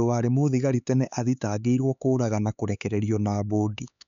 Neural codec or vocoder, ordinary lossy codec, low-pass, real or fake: codec, 16 kHz, 4 kbps, X-Codec, HuBERT features, trained on LibriSpeech; none; 7.2 kHz; fake